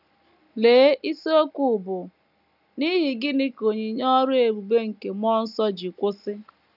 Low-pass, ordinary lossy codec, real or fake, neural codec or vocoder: 5.4 kHz; none; real; none